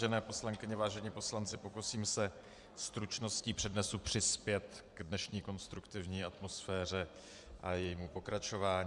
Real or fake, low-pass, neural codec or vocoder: fake; 10.8 kHz; vocoder, 24 kHz, 100 mel bands, Vocos